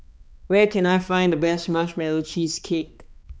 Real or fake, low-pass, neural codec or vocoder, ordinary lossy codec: fake; none; codec, 16 kHz, 2 kbps, X-Codec, HuBERT features, trained on balanced general audio; none